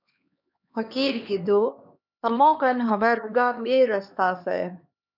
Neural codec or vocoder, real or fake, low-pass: codec, 16 kHz, 2 kbps, X-Codec, HuBERT features, trained on LibriSpeech; fake; 5.4 kHz